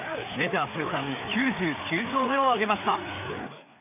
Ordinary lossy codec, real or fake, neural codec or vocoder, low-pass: none; fake; codec, 16 kHz, 4 kbps, FreqCodec, larger model; 3.6 kHz